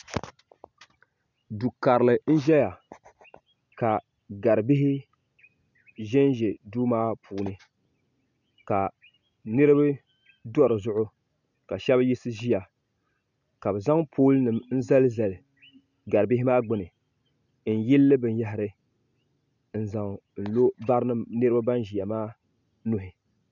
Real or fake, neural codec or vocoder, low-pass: real; none; 7.2 kHz